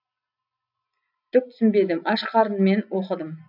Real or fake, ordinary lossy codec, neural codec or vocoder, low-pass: real; none; none; 5.4 kHz